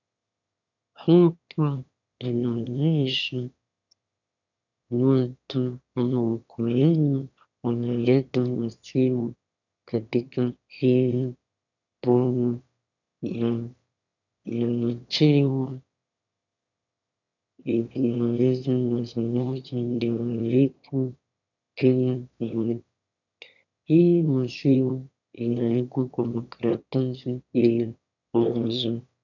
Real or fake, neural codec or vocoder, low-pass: fake; autoencoder, 22.05 kHz, a latent of 192 numbers a frame, VITS, trained on one speaker; 7.2 kHz